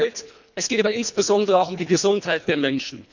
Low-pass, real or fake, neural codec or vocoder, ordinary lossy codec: 7.2 kHz; fake; codec, 24 kHz, 1.5 kbps, HILCodec; none